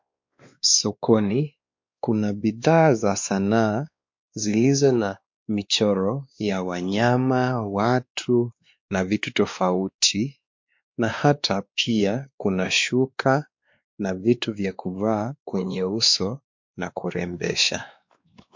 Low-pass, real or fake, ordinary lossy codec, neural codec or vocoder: 7.2 kHz; fake; MP3, 48 kbps; codec, 16 kHz, 2 kbps, X-Codec, WavLM features, trained on Multilingual LibriSpeech